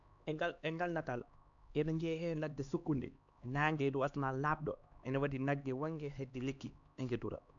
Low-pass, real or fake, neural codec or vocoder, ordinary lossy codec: 7.2 kHz; fake; codec, 16 kHz, 2 kbps, X-Codec, HuBERT features, trained on LibriSpeech; none